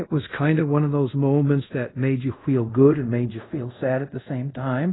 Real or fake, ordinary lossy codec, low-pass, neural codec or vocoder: fake; AAC, 16 kbps; 7.2 kHz; codec, 24 kHz, 0.5 kbps, DualCodec